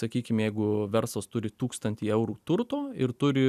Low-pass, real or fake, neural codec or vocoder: 14.4 kHz; real; none